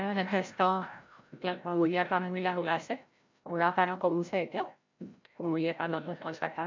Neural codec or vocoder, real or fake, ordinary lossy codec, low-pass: codec, 16 kHz, 0.5 kbps, FreqCodec, larger model; fake; MP3, 64 kbps; 7.2 kHz